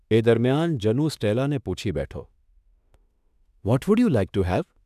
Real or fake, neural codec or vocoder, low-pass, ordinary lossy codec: fake; autoencoder, 48 kHz, 32 numbers a frame, DAC-VAE, trained on Japanese speech; 14.4 kHz; none